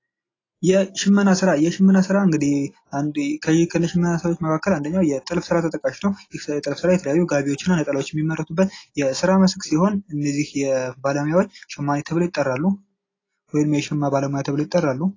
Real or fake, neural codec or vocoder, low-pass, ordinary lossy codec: real; none; 7.2 kHz; AAC, 32 kbps